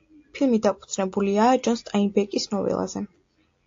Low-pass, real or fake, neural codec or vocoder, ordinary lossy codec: 7.2 kHz; real; none; AAC, 48 kbps